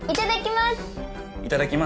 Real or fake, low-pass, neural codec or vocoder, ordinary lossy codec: real; none; none; none